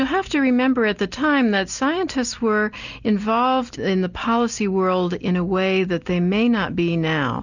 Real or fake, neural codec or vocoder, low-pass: real; none; 7.2 kHz